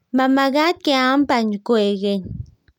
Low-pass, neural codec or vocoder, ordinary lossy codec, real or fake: 19.8 kHz; none; none; real